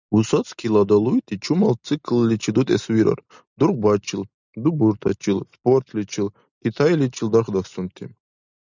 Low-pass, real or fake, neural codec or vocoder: 7.2 kHz; real; none